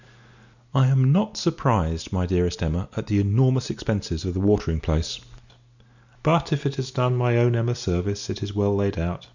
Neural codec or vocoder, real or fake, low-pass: none; real; 7.2 kHz